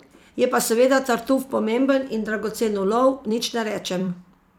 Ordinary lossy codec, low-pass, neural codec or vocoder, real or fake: none; 19.8 kHz; vocoder, 44.1 kHz, 128 mel bands every 256 samples, BigVGAN v2; fake